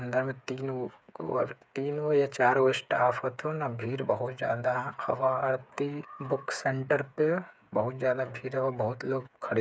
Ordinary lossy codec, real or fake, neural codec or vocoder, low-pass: none; fake; codec, 16 kHz, 8 kbps, FreqCodec, smaller model; none